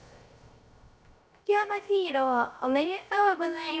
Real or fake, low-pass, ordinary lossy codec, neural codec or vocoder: fake; none; none; codec, 16 kHz, 0.3 kbps, FocalCodec